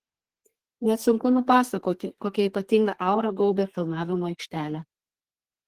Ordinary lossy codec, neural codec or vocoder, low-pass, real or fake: Opus, 16 kbps; codec, 32 kHz, 1.9 kbps, SNAC; 14.4 kHz; fake